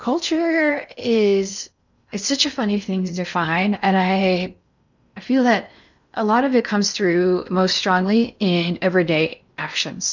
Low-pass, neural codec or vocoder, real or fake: 7.2 kHz; codec, 16 kHz in and 24 kHz out, 0.6 kbps, FocalCodec, streaming, 4096 codes; fake